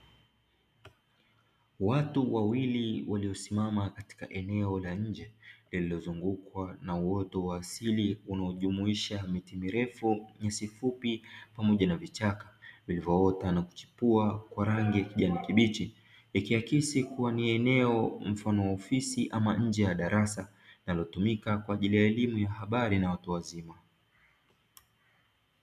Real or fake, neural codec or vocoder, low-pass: real; none; 14.4 kHz